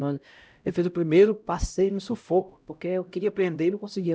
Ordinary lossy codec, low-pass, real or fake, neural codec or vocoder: none; none; fake; codec, 16 kHz, 0.5 kbps, X-Codec, HuBERT features, trained on LibriSpeech